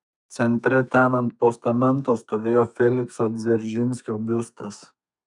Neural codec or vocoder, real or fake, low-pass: codec, 32 kHz, 1.9 kbps, SNAC; fake; 10.8 kHz